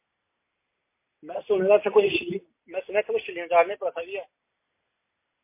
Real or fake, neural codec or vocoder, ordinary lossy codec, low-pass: real; none; MP3, 24 kbps; 3.6 kHz